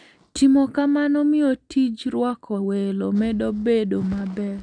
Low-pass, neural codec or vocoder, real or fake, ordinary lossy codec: 9.9 kHz; none; real; none